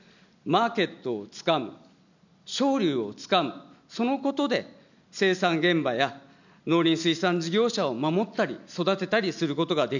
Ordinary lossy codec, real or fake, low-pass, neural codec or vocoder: none; real; 7.2 kHz; none